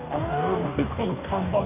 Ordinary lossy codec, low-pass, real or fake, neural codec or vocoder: none; 3.6 kHz; fake; codec, 44.1 kHz, 2.6 kbps, DAC